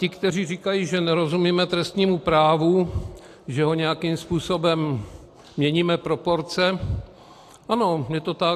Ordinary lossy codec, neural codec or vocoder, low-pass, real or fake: AAC, 64 kbps; none; 14.4 kHz; real